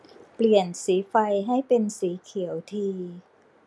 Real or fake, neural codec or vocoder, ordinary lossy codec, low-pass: real; none; none; none